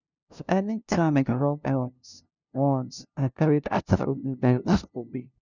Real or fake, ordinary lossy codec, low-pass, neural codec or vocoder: fake; none; 7.2 kHz; codec, 16 kHz, 0.5 kbps, FunCodec, trained on LibriTTS, 25 frames a second